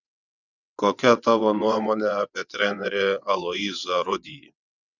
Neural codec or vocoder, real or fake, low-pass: vocoder, 22.05 kHz, 80 mel bands, WaveNeXt; fake; 7.2 kHz